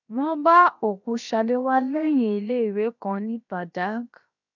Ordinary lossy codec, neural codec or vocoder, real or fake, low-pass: none; codec, 16 kHz, 0.7 kbps, FocalCodec; fake; 7.2 kHz